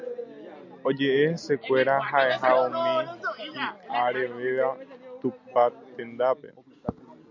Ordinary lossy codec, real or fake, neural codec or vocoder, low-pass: MP3, 48 kbps; real; none; 7.2 kHz